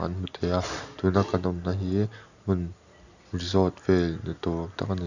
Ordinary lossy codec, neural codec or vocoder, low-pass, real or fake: none; none; 7.2 kHz; real